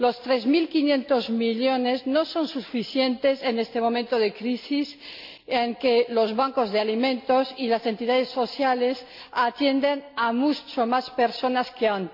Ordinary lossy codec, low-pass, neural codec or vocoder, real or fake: MP3, 48 kbps; 5.4 kHz; none; real